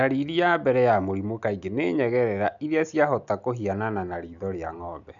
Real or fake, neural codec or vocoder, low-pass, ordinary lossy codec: real; none; 7.2 kHz; none